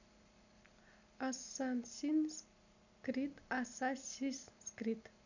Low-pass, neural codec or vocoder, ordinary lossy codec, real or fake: 7.2 kHz; none; none; real